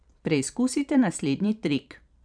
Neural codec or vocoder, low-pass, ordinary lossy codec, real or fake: vocoder, 44.1 kHz, 128 mel bands every 512 samples, BigVGAN v2; 9.9 kHz; none; fake